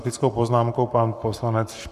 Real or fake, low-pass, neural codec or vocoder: fake; 14.4 kHz; codec, 44.1 kHz, 7.8 kbps, Pupu-Codec